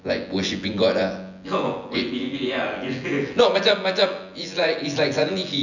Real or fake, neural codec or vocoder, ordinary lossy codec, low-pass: fake; vocoder, 24 kHz, 100 mel bands, Vocos; none; 7.2 kHz